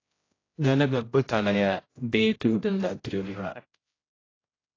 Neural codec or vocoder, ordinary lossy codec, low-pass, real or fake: codec, 16 kHz, 0.5 kbps, X-Codec, HuBERT features, trained on general audio; AAC, 32 kbps; 7.2 kHz; fake